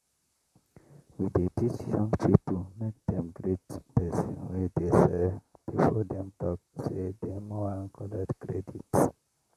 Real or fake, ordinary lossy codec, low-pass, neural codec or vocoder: fake; none; 14.4 kHz; vocoder, 44.1 kHz, 128 mel bands, Pupu-Vocoder